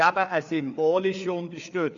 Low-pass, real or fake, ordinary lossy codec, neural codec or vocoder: 7.2 kHz; fake; MP3, 64 kbps; codec, 16 kHz, 4 kbps, FreqCodec, larger model